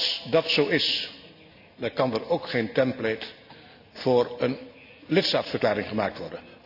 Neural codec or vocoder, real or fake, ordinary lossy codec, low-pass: none; real; none; 5.4 kHz